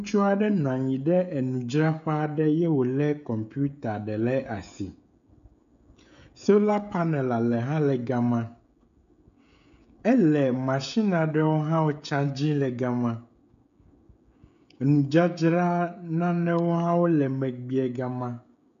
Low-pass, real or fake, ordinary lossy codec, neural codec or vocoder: 7.2 kHz; fake; MP3, 96 kbps; codec, 16 kHz, 16 kbps, FreqCodec, smaller model